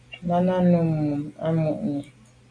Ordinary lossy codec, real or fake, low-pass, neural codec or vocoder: MP3, 64 kbps; real; 9.9 kHz; none